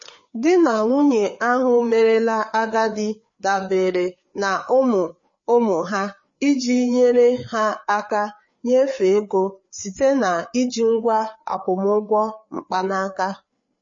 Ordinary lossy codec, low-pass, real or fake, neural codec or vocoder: MP3, 32 kbps; 7.2 kHz; fake; codec, 16 kHz, 4 kbps, FreqCodec, larger model